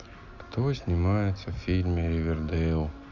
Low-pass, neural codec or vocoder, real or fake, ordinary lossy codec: 7.2 kHz; none; real; none